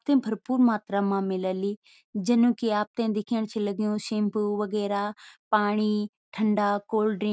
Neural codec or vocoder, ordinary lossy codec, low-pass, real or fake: none; none; none; real